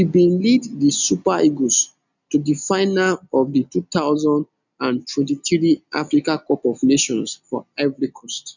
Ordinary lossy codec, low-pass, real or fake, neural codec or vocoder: none; 7.2 kHz; real; none